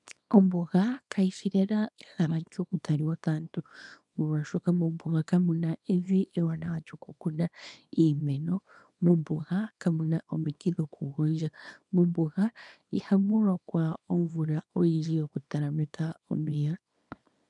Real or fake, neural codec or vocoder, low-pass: fake; codec, 24 kHz, 0.9 kbps, WavTokenizer, small release; 10.8 kHz